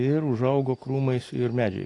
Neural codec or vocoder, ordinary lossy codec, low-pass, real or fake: none; AAC, 32 kbps; 10.8 kHz; real